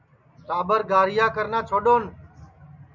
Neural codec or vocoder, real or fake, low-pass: none; real; 7.2 kHz